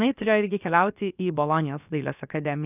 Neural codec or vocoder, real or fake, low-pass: codec, 16 kHz, about 1 kbps, DyCAST, with the encoder's durations; fake; 3.6 kHz